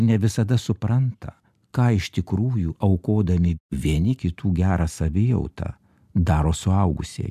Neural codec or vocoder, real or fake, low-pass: none; real; 14.4 kHz